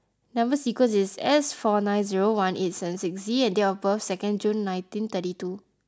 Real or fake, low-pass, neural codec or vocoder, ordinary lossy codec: real; none; none; none